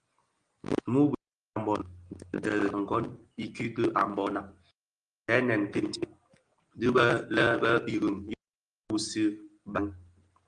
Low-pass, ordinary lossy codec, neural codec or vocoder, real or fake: 9.9 kHz; Opus, 24 kbps; none; real